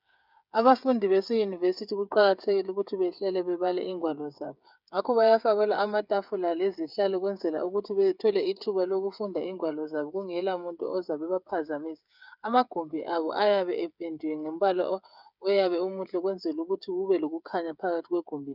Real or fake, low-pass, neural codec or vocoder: fake; 5.4 kHz; codec, 16 kHz, 8 kbps, FreqCodec, smaller model